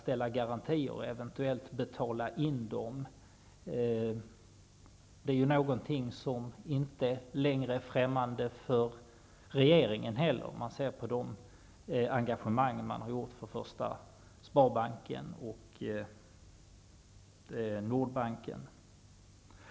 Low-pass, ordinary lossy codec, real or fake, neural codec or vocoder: none; none; real; none